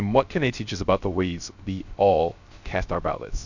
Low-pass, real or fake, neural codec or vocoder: 7.2 kHz; fake; codec, 16 kHz, 0.3 kbps, FocalCodec